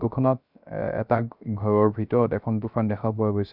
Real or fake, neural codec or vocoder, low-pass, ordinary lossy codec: fake; codec, 16 kHz, 0.3 kbps, FocalCodec; 5.4 kHz; none